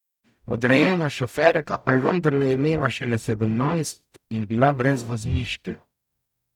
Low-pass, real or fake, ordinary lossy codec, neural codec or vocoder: 19.8 kHz; fake; none; codec, 44.1 kHz, 0.9 kbps, DAC